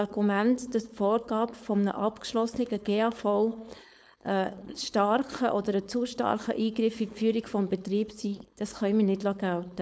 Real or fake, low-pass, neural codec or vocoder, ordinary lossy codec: fake; none; codec, 16 kHz, 4.8 kbps, FACodec; none